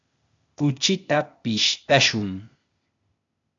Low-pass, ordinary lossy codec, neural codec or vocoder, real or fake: 7.2 kHz; AAC, 64 kbps; codec, 16 kHz, 0.8 kbps, ZipCodec; fake